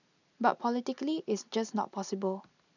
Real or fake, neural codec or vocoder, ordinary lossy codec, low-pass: real; none; none; 7.2 kHz